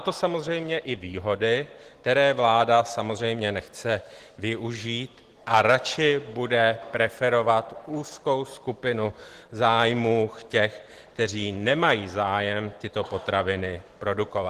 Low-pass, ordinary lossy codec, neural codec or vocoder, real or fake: 14.4 kHz; Opus, 16 kbps; none; real